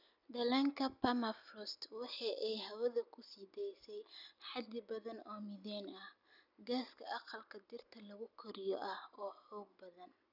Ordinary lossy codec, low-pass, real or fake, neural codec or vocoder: none; 5.4 kHz; real; none